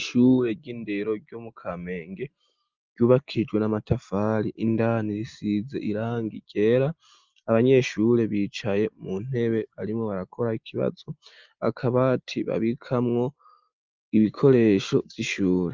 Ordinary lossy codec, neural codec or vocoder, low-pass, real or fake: Opus, 24 kbps; none; 7.2 kHz; real